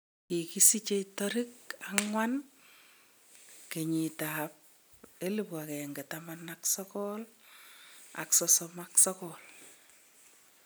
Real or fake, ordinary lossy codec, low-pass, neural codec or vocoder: real; none; none; none